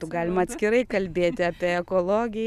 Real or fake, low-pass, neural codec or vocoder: real; 14.4 kHz; none